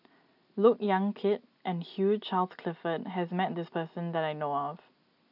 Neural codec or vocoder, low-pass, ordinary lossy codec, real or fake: none; 5.4 kHz; none; real